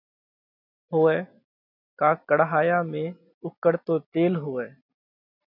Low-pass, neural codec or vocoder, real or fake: 5.4 kHz; none; real